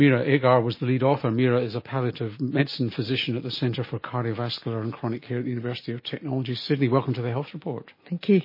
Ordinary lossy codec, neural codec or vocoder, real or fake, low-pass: MP3, 24 kbps; none; real; 5.4 kHz